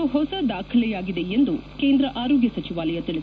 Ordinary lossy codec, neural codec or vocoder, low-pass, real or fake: none; none; none; real